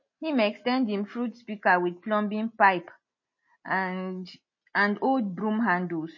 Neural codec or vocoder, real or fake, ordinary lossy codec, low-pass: none; real; MP3, 32 kbps; 7.2 kHz